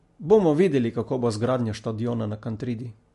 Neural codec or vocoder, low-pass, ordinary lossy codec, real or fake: none; 14.4 kHz; MP3, 48 kbps; real